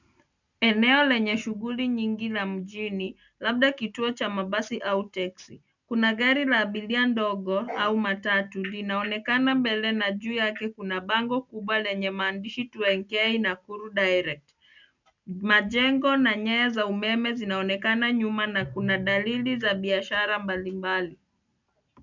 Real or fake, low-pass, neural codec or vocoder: real; 7.2 kHz; none